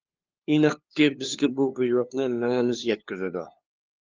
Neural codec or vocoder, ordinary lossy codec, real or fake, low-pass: codec, 16 kHz, 2 kbps, FunCodec, trained on LibriTTS, 25 frames a second; Opus, 32 kbps; fake; 7.2 kHz